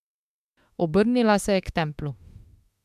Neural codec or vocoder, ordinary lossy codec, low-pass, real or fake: autoencoder, 48 kHz, 32 numbers a frame, DAC-VAE, trained on Japanese speech; MP3, 96 kbps; 14.4 kHz; fake